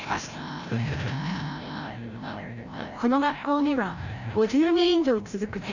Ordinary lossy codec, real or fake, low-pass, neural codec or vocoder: none; fake; 7.2 kHz; codec, 16 kHz, 0.5 kbps, FreqCodec, larger model